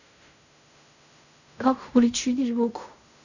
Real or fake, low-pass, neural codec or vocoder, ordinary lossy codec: fake; 7.2 kHz; codec, 16 kHz in and 24 kHz out, 0.4 kbps, LongCat-Audio-Codec, fine tuned four codebook decoder; none